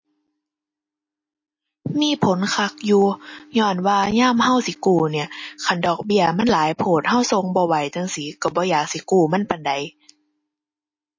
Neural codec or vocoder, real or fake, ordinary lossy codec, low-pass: none; real; MP3, 32 kbps; 7.2 kHz